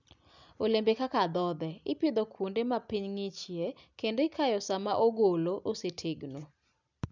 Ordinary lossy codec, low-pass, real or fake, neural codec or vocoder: none; 7.2 kHz; real; none